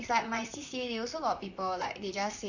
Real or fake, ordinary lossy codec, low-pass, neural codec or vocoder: fake; none; 7.2 kHz; vocoder, 44.1 kHz, 128 mel bands, Pupu-Vocoder